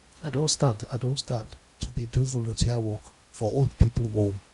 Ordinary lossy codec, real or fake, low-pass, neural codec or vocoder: Opus, 64 kbps; fake; 10.8 kHz; codec, 16 kHz in and 24 kHz out, 0.8 kbps, FocalCodec, streaming, 65536 codes